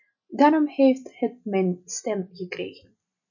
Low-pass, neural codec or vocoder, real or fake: 7.2 kHz; none; real